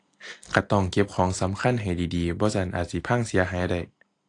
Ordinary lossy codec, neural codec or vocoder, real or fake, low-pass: AAC, 48 kbps; none; real; 10.8 kHz